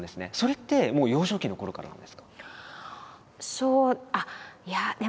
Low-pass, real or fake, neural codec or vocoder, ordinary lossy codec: none; real; none; none